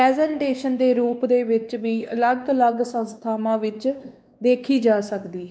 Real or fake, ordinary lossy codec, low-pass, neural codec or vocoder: fake; none; none; codec, 16 kHz, 2 kbps, X-Codec, WavLM features, trained on Multilingual LibriSpeech